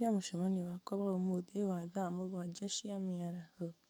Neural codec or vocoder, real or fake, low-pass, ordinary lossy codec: codec, 44.1 kHz, 7.8 kbps, DAC; fake; none; none